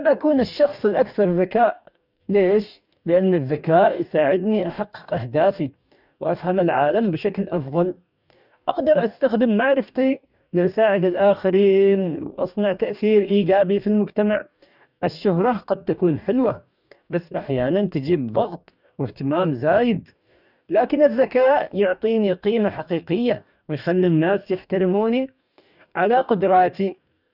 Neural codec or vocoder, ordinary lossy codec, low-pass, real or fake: codec, 44.1 kHz, 2.6 kbps, DAC; none; 5.4 kHz; fake